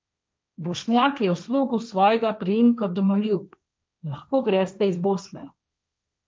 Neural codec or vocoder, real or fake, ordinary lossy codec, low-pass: codec, 16 kHz, 1.1 kbps, Voila-Tokenizer; fake; none; none